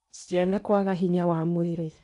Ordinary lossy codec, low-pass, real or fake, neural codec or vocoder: none; 10.8 kHz; fake; codec, 16 kHz in and 24 kHz out, 0.8 kbps, FocalCodec, streaming, 65536 codes